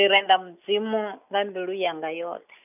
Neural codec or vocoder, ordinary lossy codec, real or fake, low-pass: none; none; real; 3.6 kHz